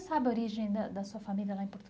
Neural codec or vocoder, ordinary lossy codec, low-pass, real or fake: none; none; none; real